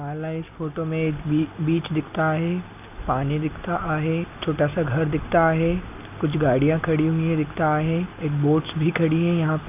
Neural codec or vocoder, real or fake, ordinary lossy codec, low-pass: none; real; none; 3.6 kHz